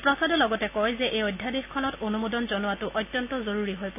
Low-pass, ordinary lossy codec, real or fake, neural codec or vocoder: 3.6 kHz; none; real; none